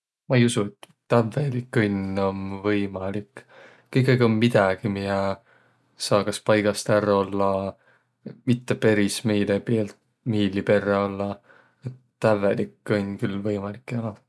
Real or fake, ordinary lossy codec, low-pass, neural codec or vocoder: real; none; none; none